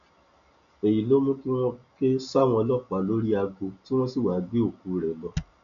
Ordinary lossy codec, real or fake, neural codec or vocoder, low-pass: none; real; none; 7.2 kHz